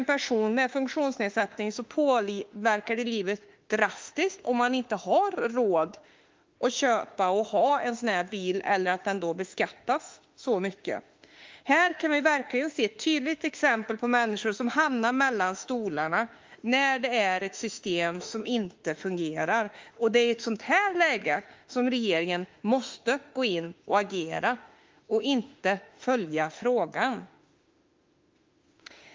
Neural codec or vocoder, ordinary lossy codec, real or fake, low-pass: autoencoder, 48 kHz, 32 numbers a frame, DAC-VAE, trained on Japanese speech; Opus, 32 kbps; fake; 7.2 kHz